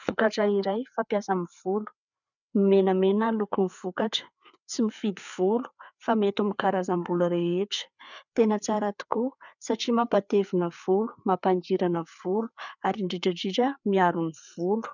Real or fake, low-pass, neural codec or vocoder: fake; 7.2 kHz; codec, 16 kHz, 4 kbps, FreqCodec, larger model